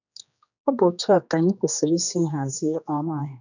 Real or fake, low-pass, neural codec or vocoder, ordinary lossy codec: fake; 7.2 kHz; codec, 16 kHz, 2 kbps, X-Codec, HuBERT features, trained on general audio; none